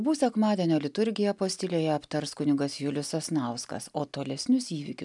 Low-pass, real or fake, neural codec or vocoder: 10.8 kHz; real; none